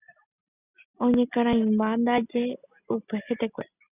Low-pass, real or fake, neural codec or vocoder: 3.6 kHz; real; none